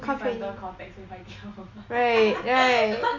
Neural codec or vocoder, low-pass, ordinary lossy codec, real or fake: none; 7.2 kHz; Opus, 64 kbps; real